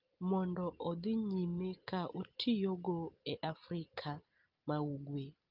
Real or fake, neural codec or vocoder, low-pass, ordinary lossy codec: real; none; 5.4 kHz; Opus, 32 kbps